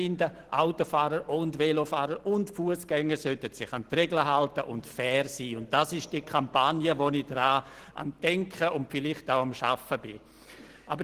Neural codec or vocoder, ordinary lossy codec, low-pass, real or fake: none; Opus, 16 kbps; 14.4 kHz; real